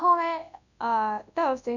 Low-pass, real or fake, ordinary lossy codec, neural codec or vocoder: 7.2 kHz; fake; none; codec, 24 kHz, 0.9 kbps, WavTokenizer, large speech release